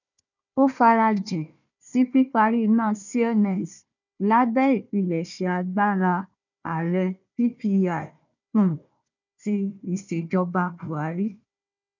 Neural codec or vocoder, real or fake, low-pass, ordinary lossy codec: codec, 16 kHz, 1 kbps, FunCodec, trained on Chinese and English, 50 frames a second; fake; 7.2 kHz; none